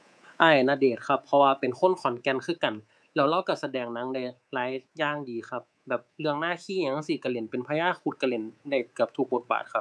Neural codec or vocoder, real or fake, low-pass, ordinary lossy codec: codec, 24 kHz, 3.1 kbps, DualCodec; fake; none; none